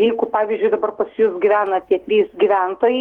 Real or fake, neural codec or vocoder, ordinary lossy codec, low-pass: fake; autoencoder, 48 kHz, 128 numbers a frame, DAC-VAE, trained on Japanese speech; Opus, 16 kbps; 19.8 kHz